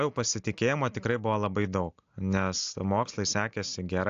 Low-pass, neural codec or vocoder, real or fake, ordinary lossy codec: 7.2 kHz; none; real; AAC, 64 kbps